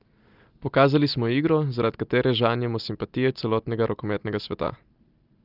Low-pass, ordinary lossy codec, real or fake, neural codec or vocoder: 5.4 kHz; Opus, 24 kbps; real; none